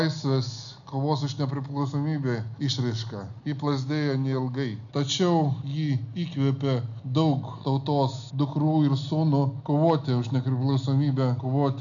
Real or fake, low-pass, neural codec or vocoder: real; 7.2 kHz; none